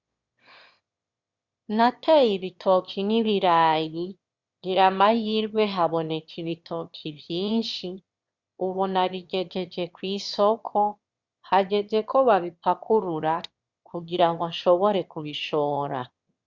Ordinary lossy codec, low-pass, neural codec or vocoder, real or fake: Opus, 64 kbps; 7.2 kHz; autoencoder, 22.05 kHz, a latent of 192 numbers a frame, VITS, trained on one speaker; fake